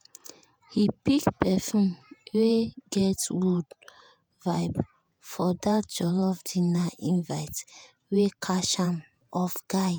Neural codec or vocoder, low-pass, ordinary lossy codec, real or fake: vocoder, 48 kHz, 128 mel bands, Vocos; none; none; fake